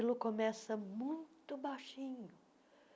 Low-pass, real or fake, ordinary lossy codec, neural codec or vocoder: none; real; none; none